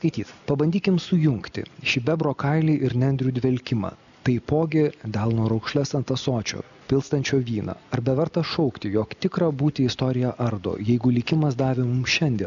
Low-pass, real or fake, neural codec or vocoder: 7.2 kHz; real; none